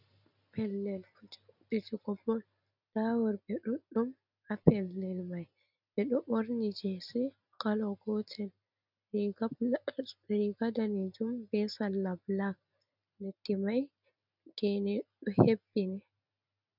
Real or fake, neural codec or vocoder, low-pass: real; none; 5.4 kHz